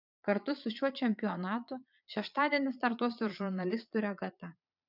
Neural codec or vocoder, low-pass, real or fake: vocoder, 22.05 kHz, 80 mel bands, Vocos; 5.4 kHz; fake